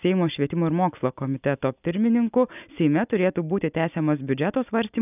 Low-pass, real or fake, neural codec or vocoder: 3.6 kHz; real; none